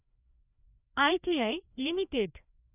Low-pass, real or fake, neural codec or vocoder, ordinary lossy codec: 3.6 kHz; fake; codec, 16 kHz, 1 kbps, FreqCodec, larger model; none